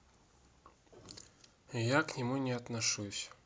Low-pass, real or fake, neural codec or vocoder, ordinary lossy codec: none; real; none; none